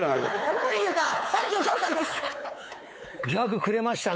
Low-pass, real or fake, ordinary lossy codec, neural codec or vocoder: none; fake; none; codec, 16 kHz, 4 kbps, X-Codec, WavLM features, trained on Multilingual LibriSpeech